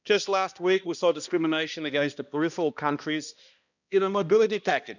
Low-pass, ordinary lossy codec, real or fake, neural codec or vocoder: 7.2 kHz; none; fake; codec, 16 kHz, 1 kbps, X-Codec, HuBERT features, trained on balanced general audio